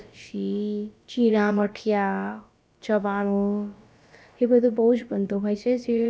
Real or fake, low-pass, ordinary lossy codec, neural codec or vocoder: fake; none; none; codec, 16 kHz, about 1 kbps, DyCAST, with the encoder's durations